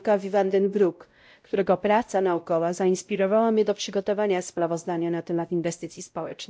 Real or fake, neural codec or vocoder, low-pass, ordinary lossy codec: fake; codec, 16 kHz, 0.5 kbps, X-Codec, WavLM features, trained on Multilingual LibriSpeech; none; none